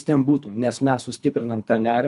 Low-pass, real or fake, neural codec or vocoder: 10.8 kHz; fake; codec, 24 kHz, 3 kbps, HILCodec